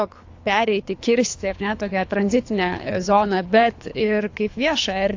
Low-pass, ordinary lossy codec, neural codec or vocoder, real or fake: 7.2 kHz; AAC, 48 kbps; codec, 24 kHz, 3 kbps, HILCodec; fake